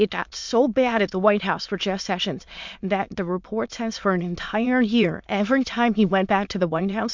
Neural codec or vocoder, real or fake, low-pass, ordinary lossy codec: autoencoder, 22.05 kHz, a latent of 192 numbers a frame, VITS, trained on many speakers; fake; 7.2 kHz; MP3, 64 kbps